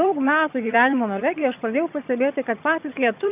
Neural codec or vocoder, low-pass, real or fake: vocoder, 22.05 kHz, 80 mel bands, HiFi-GAN; 3.6 kHz; fake